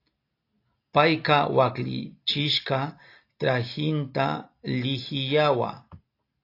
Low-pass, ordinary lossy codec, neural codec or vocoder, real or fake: 5.4 kHz; AAC, 32 kbps; none; real